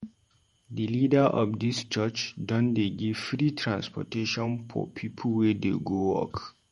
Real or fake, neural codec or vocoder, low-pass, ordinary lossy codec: fake; vocoder, 48 kHz, 128 mel bands, Vocos; 19.8 kHz; MP3, 48 kbps